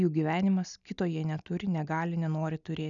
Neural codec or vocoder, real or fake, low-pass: none; real; 7.2 kHz